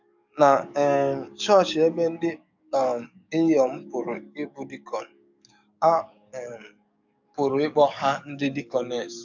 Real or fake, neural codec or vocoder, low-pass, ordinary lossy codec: fake; codec, 44.1 kHz, 7.8 kbps, DAC; 7.2 kHz; none